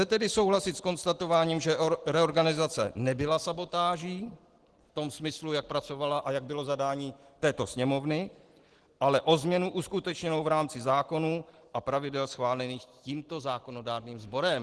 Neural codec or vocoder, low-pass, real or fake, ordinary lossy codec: none; 10.8 kHz; real; Opus, 16 kbps